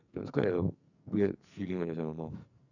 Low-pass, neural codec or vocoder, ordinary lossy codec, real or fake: 7.2 kHz; codec, 44.1 kHz, 2.6 kbps, SNAC; none; fake